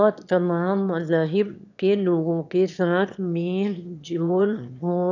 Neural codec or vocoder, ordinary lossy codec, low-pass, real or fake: autoencoder, 22.05 kHz, a latent of 192 numbers a frame, VITS, trained on one speaker; none; 7.2 kHz; fake